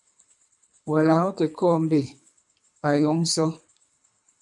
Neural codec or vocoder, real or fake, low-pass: codec, 24 kHz, 3 kbps, HILCodec; fake; 10.8 kHz